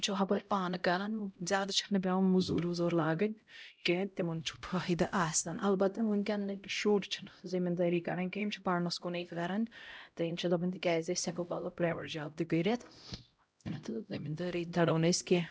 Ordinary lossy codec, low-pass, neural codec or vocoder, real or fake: none; none; codec, 16 kHz, 0.5 kbps, X-Codec, HuBERT features, trained on LibriSpeech; fake